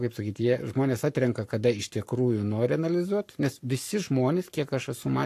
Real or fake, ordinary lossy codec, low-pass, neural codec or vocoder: fake; AAC, 64 kbps; 14.4 kHz; codec, 44.1 kHz, 7.8 kbps, Pupu-Codec